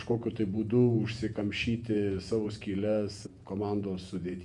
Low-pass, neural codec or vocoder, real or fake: 10.8 kHz; vocoder, 48 kHz, 128 mel bands, Vocos; fake